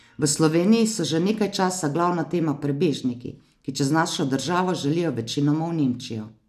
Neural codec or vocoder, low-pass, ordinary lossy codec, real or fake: none; 14.4 kHz; none; real